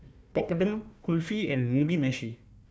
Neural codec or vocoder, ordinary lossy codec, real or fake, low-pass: codec, 16 kHz, 1 kbps, FunCodec, trained on Chinese and English, 50 frames a second; none; fake; none